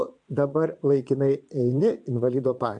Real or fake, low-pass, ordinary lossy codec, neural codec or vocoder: fake; 9.9 kHz; MP3, 64 kbps; vocoder, 22.05 kHz, 80 mel bands, Vocos